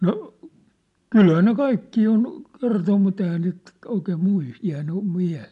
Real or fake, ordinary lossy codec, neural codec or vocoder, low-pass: real; none; none; 10.8 kHz